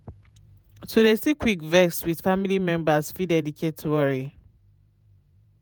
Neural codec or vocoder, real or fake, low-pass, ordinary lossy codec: vocoder, 48 kHz, 128 mel bands, Vocos; fake; none; none